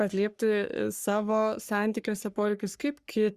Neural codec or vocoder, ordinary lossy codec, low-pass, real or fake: codec, 44.1 kHz, 3.4 kbps, Pupu-Codec; Opus, 64 kbps; 14.4 kHz; fake